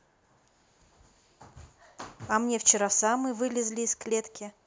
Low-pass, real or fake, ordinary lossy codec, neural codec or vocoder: none; real; none; none